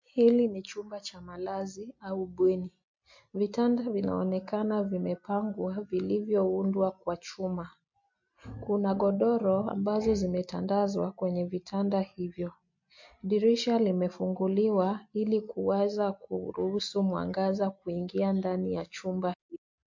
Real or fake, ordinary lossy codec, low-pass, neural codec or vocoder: real; MP3, 48 kbps; 7.2 kHz; none